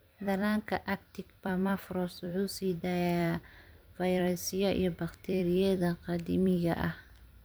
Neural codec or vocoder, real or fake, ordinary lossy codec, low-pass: vocoder, 44.1 kHz, 128 mel bands every 256 samples, BigVGAN v2; fake; none; none